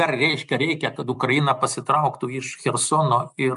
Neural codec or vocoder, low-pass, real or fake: none; 10.8 kHz; real